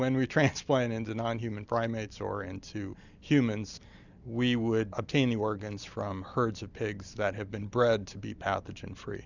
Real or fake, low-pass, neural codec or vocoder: real; 7.2 kHz; none